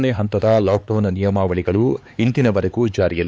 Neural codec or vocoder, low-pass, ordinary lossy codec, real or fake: codec, 16 kHz, 4 kbps, X-Codec, HuBERT features, trained on LibriSpeech; none; none; fake